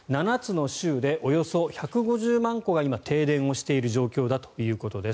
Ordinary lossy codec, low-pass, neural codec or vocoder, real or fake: none; none; none; real